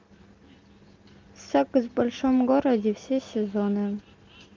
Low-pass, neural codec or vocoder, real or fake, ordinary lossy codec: 7.2 kHz; none; real; Opus, 32 kbps